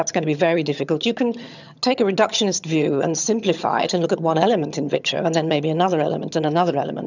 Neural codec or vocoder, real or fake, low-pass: vocoder, 22.05 kHz, 80 mel bands, HiFi-GAN; fake; 7.2 kHz